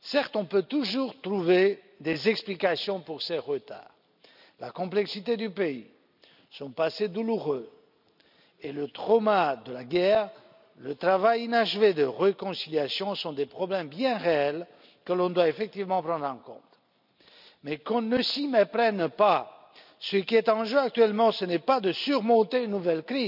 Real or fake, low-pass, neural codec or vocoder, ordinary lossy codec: real; 5.4 kHz; none; none